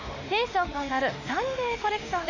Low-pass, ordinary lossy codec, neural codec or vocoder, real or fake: 7.2 kHz; none; autoencoder, 48 kHz, 32 numbers a frame, DAC-VAE, trained on Japanese speech; fake